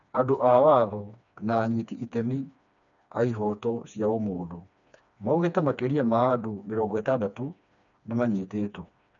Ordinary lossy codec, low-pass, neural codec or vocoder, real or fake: none; 7.2 kHz; codec, 16 kHz, 2 kbps, FreqCodec, smaller model; fake